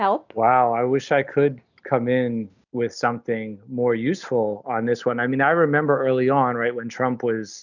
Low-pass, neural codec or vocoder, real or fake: 7.2 kHz; none; real